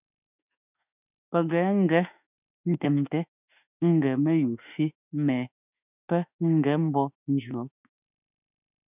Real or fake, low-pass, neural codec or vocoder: fake; 3.6 kHz; autoencoder, 48 kHz, 32 numbers a frame, DAC-VAE, trained on Japanese speech